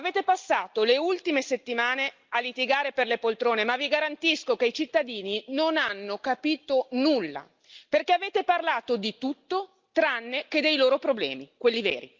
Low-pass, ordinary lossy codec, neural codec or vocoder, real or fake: 7.2 kHz; Opus, 24 kbps; none; real